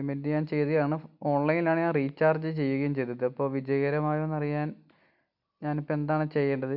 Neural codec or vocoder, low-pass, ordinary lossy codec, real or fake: none; 5.4 kHz; none; real